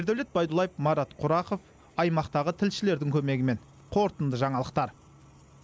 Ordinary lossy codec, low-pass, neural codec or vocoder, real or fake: none; none; none; real